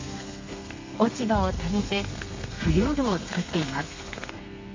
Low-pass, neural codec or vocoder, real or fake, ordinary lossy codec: 7.2 kHz; codec, 32 kHz, 1.9 kbps, SNAC; fake; MP3, 64 kbps